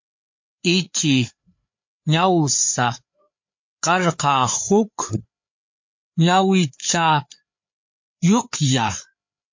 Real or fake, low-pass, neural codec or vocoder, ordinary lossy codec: fake; 7.2 kHz; codec, 16 kHz, 4 kbps, FreqCodec, larger model; MP3, 48 kbps